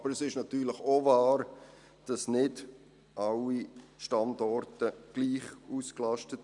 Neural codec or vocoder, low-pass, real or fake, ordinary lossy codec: none; 10.8 kHz; real; none